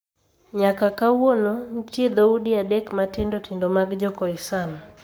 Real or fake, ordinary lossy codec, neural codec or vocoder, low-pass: fake; none; codec, 44.1 kHz, 7.8 kbps, Pupu-Codec; none